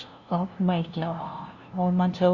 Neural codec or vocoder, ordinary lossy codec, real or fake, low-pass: codec, 16 kHz, 0.5 kbps, FunCodec, trained on LibriTTS, 25 frames a second; Opus, 64 kbps; fake; 7.2 kHz